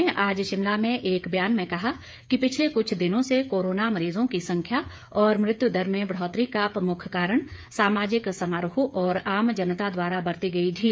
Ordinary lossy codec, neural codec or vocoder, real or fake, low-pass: none; codec, 16 kHz, 8 kbps, FreqCodec, smaller model; fake; none